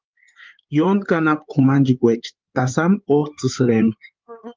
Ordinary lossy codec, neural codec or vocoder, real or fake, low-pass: Opus, 24 kbps; codec, 16 kHz in and 24 kHz out, 2.2 kbps, FireRedTTS-2 codec; fake; 7.2 kHz